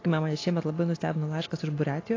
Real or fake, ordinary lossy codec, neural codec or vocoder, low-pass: real; AAC, 32 kbps; none; 7.2 kHz